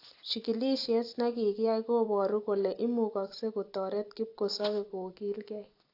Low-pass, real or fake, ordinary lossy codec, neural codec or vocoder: 5.4 kHz; fake; AAC, 32 kbps; vocoder, 44.1 kHz, 128 mel bands every 256 samples, BigVGAN v2